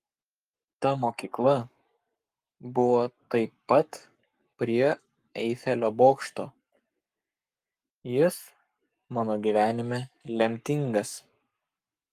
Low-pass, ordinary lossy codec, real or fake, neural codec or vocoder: 14.4 kHz; Opus, 32 kbps; fake; codec, 44.1 kHz, 7.8 kbps, Pupu-Codec